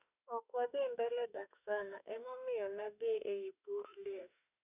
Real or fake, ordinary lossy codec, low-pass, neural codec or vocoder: fake; none; 3.6 kHz; autoencoder, 48 kHz, 32 numbers a frame, DAC-VAE, trained on Japanese speech